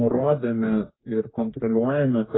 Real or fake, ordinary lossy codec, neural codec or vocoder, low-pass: fake; AAC, 16 kbps; codec, 44.1 kHz, 2.6 kbps, DAC; 7.2 kHz